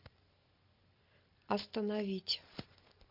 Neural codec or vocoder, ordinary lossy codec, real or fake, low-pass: none; AAC, 48 kbps; real; 5.4 kHz